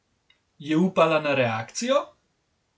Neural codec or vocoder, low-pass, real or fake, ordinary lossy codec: none; none; real; none